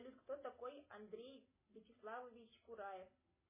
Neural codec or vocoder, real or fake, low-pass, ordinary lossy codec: none; real; 3.6 kHz; MP3, 16 kbps